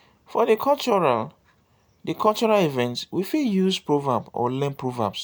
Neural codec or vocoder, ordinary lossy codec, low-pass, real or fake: none; none; none; real